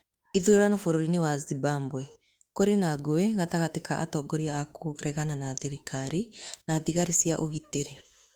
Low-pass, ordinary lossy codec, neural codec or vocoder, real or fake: 19.8 kHz; Opus, 32 kbps; autoencoder, 48 kHz, 32 numbers a frame, DAC-VAE, trained on Japanese speech; fake